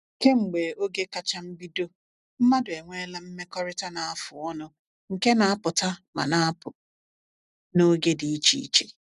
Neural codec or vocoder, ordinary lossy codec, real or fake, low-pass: none; none; real; 10.8 kHz